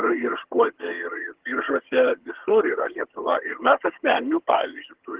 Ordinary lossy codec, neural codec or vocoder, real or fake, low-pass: Opus, 16 kbps; vocoder, 22.05 kHz, 80 mel bands, HiFi-GAN; fake; 3.6 kHz